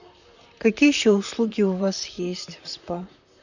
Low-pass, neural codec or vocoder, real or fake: 7.2 kHz; vocoder, 44.1 kHz, 128 mel bands, Pupu-Vocoder; fake